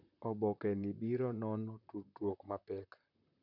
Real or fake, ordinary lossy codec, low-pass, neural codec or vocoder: fake; none; 5.4 kHz; vocoder, 44.1 kHz, 128 mel bands, Pupu-Vocoder